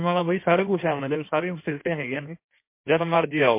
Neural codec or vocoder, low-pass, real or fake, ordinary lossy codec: codec, 16 kHz in and 24 kHz out, 1.1 kbps, FireRedTTS-2 codec; 3.6 kHz; fake; MP3, 24 kbps